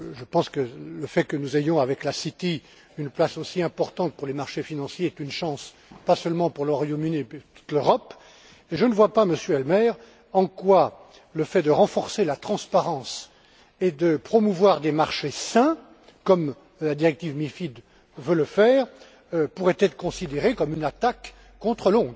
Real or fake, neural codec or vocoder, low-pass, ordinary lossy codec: real; none; none; none